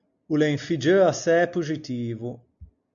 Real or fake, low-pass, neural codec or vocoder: real; 7.2 kHz; none